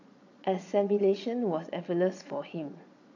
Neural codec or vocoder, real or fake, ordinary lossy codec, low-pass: vocoder, 22.05 kHz, 80 mel bands, Vocos; fake; none; 7.2 kHz